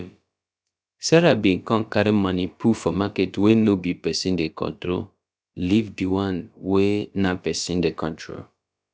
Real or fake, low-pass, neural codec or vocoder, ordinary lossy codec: fake; none; codec, 16 kHz, about 1 kbps, DyCAST, with the encoder's durations; none